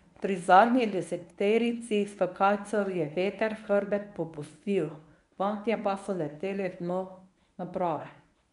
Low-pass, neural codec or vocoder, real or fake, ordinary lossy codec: 10.8 kHz; codec, 24 kHz, 0.9 kbps, WavTokenizer, medium speech release version 1; fake; MP3, 96 kbps